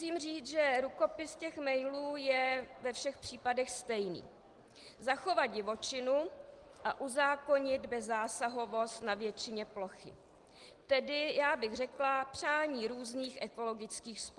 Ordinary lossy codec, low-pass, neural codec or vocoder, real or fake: Opus, 24 kbps; 10.8 kHz; none; real